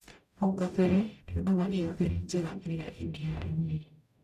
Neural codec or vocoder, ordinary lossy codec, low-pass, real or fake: codec, 44.1 kHz, 0.9 kbps, DAC; none; 14.4 kHz; fake